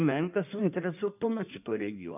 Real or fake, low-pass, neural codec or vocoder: fake; 3.6 kHz; codec, 16 kHz in and 24 kHz out, 1.1 kbps, FireRedTTS-2 codec